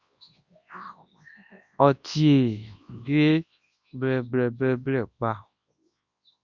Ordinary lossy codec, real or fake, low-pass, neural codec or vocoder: Opus, 64 kbps; fake; 7.2 kHz; codec, 24 kHz, 0.9 kbps, WavTokenizer, large speech release